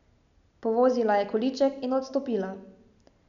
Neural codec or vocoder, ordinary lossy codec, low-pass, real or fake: none; none; 7.2 kHz; real